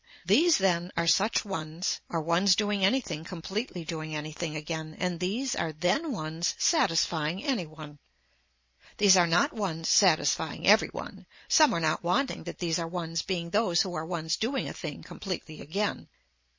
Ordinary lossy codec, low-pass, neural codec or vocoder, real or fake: MP3, 32 kbps; 7.2 kHz; none; real